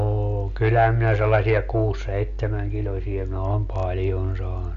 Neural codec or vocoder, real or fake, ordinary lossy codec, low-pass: none; real; none; 7.2 kHz